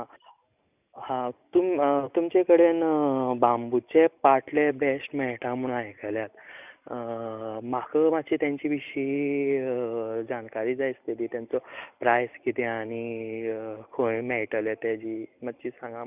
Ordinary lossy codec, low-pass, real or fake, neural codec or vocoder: none; 3.6 kHz; real; none